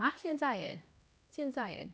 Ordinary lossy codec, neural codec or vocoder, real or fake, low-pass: none; codec, 16 kHz, 1 kbps, X-Codec, HuBERT features, trained on LibriSpeech; fake; none